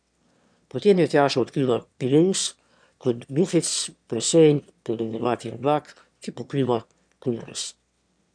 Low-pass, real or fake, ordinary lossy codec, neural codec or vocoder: 9.9 kHz; fake; MP3, 96 kbps; autoencoder, 22.05 kHz, a latent of 192 numbers a frame, VITS, trained on one speaker